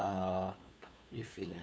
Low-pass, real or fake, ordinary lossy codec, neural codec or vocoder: none; fake; none; codec, 16 kHz, 2 kbps, FunCodec, trained on LibriTTS, 25 frames a second